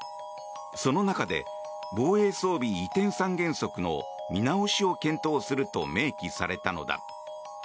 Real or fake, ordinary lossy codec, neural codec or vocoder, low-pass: real; none; none; none